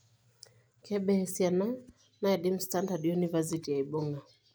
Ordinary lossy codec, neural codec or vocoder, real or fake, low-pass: none; none; real; none